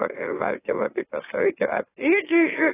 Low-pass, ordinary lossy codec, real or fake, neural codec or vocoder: 3.6 kHz; AAC, 16 kbps; fake; autoencoder, 44.1 kHz, a latent of 192 numbers a frame, MeloTTS